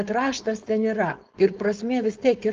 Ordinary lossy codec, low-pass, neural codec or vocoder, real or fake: Opus, 32 kbps; 7.2 kHz; codec, 16 kHz, 4.8 kbps, FACodec; fake